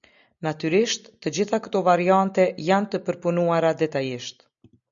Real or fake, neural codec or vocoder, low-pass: real; none; 7.2 kHz